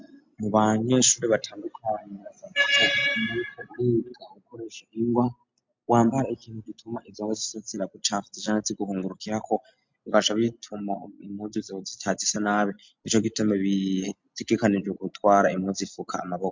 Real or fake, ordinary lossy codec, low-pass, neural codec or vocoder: real; MP3, 64 kbps; 7.2 kHz; none